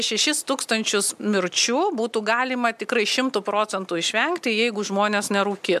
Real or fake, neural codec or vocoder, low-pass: real; none; 14.4 kHz